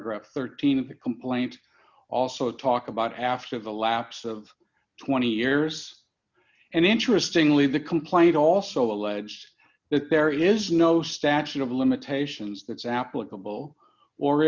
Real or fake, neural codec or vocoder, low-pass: real; none; 7.2 kHz